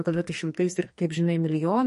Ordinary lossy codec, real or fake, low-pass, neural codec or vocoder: MP3, 48 kbps; fake; 14.4 kHz; codec, 44.1 kHz, 2.6 kbps, SNAC